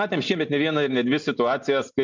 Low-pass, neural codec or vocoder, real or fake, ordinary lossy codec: 7.2 kHz; none; real; MP3, 64 kbps